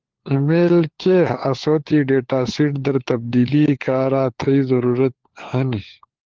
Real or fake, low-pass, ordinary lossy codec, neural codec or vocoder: fake; 7.2 kHz; Opus, 16 kbps; codec, 16 kHz, 4 kbps, FunCodec, trained on LibriTTS, 50 frames a second